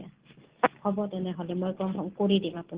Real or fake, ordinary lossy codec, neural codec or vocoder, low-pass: real; Opus, 16 kbps; none; 3.6 kHz